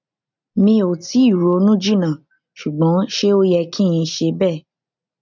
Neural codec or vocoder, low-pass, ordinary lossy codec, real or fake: none; 7.2 kHz; none; real